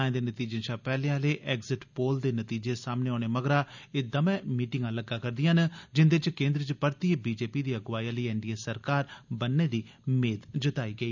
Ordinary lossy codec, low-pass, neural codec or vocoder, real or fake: none; 7.2 kHz; none; real